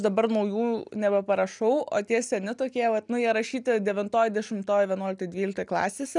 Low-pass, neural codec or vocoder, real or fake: 10.8 kHz; none; real